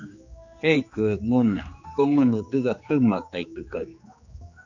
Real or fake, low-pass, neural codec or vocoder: fake; 7.2 kHz; codec, 16 kHz, 2 kbps, X-Codec, HuBERT features, trained on general audio